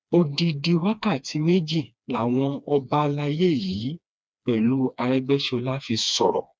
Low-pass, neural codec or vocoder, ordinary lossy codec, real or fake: none; codec, 16 kHz, 2 kbps, FreqCodec, smaller model; none; fake